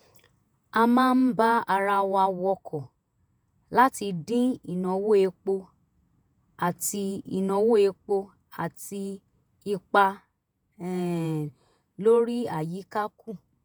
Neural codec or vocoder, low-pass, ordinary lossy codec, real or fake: vocoder, 48 kHz, 128 mel bands, Vocos; none; none; fake